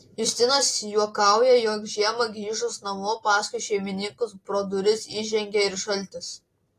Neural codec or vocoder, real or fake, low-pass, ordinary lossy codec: none; real; 14.4 kHz; AAC, 48 kbps